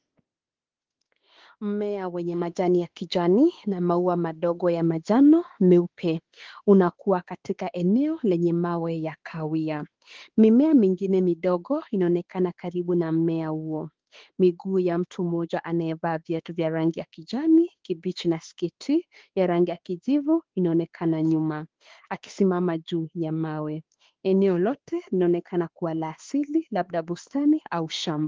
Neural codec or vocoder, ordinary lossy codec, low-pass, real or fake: autoencoder, 48 kHz, 32 numbers a frame, DAC-VAE, trained on Japanese speech; Opus, 16 kbps; 7.2 kHz; fake